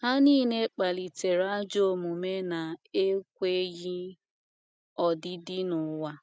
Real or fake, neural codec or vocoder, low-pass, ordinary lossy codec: real; none; none; none